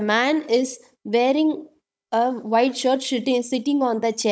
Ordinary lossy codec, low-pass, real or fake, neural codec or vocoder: none; none; fake; codec, 16 kHz, 16 kbps, FunCodec, trained on Chinese and English, 50 frames a second